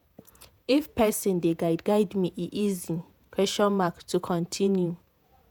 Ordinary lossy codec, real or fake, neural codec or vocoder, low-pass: none; fake; vocoder, 48 kHz, 128 mel bands, Vocos; none